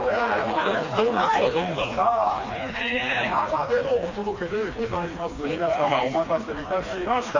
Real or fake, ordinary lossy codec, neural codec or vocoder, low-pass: fake; AAC, 32 kbps; codec, 16 kHz, 2 kbps, FreqCodec, smaller model; 7.2 kHz